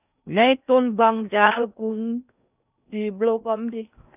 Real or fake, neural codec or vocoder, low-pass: fake; codec, 16 kHz in and 24 kHz out, 0.6 kbps, FocalCodec, streaming, 4096 codes; 3.6 kHz